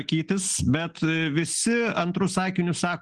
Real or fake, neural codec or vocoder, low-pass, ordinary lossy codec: real; none; 9.9 kHz; Opus, 16 kbps